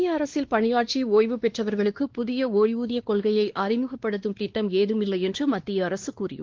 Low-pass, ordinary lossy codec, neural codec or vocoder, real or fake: 7.2 kHz; Opus, 16 kbps; codec, 16 kHz, 2 kbps, X-Codec, WavLM features, trained on Multilingual LibriSpeech; fake